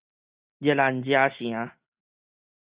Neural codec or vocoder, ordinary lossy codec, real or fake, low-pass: none; Opus, 64 kbps; real; 3.6 kHz